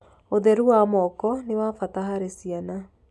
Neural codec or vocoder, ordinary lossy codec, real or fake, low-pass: none; none; real; none